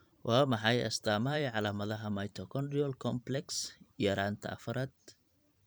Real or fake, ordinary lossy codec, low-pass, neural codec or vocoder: fake; none; none; vocoder, 44.1 kHz, 128 mel bands every 512 samples, BigVGAN v2